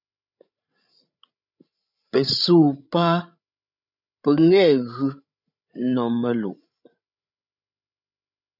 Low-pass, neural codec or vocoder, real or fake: 5.4 kHz; codec, 16 kHz, 16 kbps, FreqCodec, larger model; fake